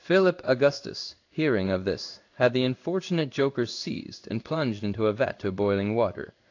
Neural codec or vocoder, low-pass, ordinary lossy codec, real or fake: codec, 16 kHz in and 24 kHz out, 1 kbps, XY-Tokenizer; 7.2 kHz; AAC, 48 kbps; fake